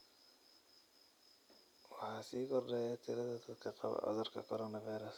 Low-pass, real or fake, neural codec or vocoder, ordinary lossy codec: 19.8 kHz; real; none; none